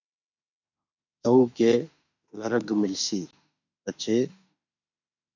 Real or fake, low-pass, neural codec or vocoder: fake; 7.2 kHz; autoencoder, 48 kHz, 32 numbers a frame, DAC-VAE, trained on Japanese speech